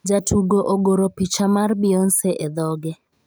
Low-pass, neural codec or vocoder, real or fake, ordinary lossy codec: none; none; real; none